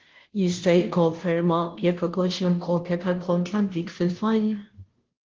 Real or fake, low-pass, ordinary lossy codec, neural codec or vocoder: fake; 7.2 kHz; Opus, 16 kbps; codec, 16 kHz, 0.5 kbps, FunCodec, trained on Chinese and English, 25 frames a second